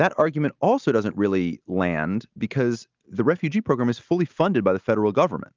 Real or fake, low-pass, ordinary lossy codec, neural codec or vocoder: real; 7.2 kHz; Opus, 32 kbps; none